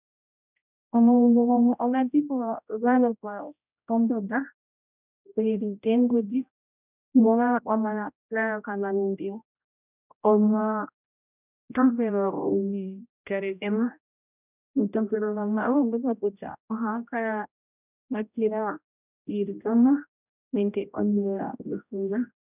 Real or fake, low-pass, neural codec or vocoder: fake; 3.6 kHz; codec, 16 kHz, 0.5 kbps, X-Codec, HuBERT features, trained on general audio